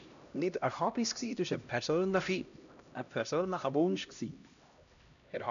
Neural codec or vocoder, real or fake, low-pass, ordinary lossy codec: codec, 16 kHz, 1 kbps, X-Codec, HuBERT features, trained on LibriSpeech; fake; 7.2 kHz; none